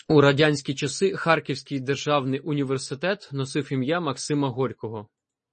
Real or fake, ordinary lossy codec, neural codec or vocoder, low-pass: real; MP3, 32 kbps; none; 10.8 kHz